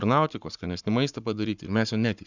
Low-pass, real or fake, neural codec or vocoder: 7.2 kHz; fake; codec, 44.1 kHz, 7.8 kbps, Pupu-Codec